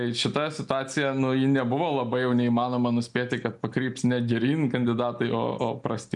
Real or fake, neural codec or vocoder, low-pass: real; none; 10.8 kHz